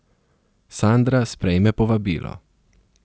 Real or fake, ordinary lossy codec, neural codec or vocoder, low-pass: real; none; none; none